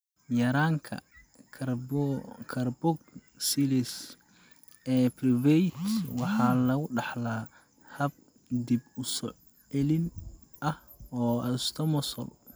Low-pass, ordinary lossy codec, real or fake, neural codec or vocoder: none; none; real; none